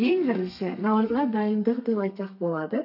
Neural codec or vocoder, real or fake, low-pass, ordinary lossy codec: codec, 32 kHz, 1.9 kbps, SNAC; fake; 5.4 kHz; none